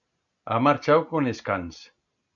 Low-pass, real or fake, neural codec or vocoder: 7.2 kHz; real; none